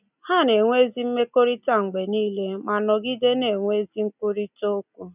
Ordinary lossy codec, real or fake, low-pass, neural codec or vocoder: none; real; 3.6 kHz; none